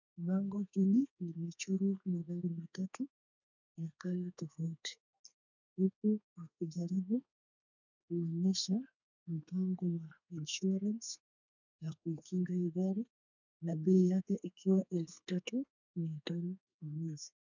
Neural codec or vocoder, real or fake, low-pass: codec, 32 kHz, 1.9 kbps, SNAC; fake; 7.2 kHz